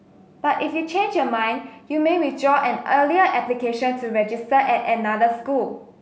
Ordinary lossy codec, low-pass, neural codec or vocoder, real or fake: none; none; none; real